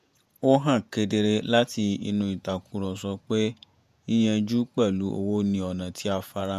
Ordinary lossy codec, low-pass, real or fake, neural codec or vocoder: MP3, 96 kbps; 14.4 kHz; fake; vocoder, 48 kHz, 128 mel bands, Vocos